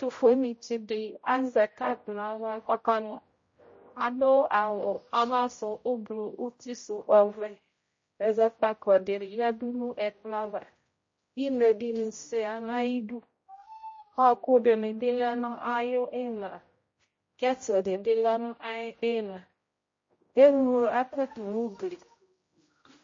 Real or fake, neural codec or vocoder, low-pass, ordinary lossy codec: fake; codec, 16 kHz, 0.5 kbps, X-Codec, HuBERT features, trained on general audio; 7.2 kHz; MP3, 32 kbps